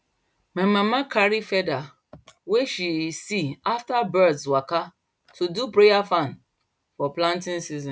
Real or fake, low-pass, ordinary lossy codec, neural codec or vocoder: real; none; none; none